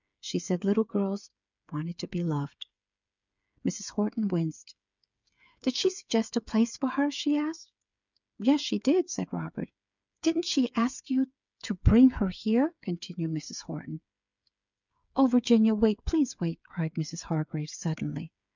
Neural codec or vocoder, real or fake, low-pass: codec, 16 kHz, 8 kbps, FreqCodec, smaller model; fake; 7.2 kHz